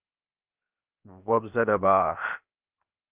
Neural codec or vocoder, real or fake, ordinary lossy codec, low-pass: codec, 16 kHz, 0.7 kbps, FocalCodec; fake; Opus, 32 kbps; 3.6 kHz